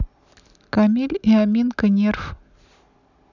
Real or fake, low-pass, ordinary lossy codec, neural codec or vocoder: real; 7.2 kHz; none; none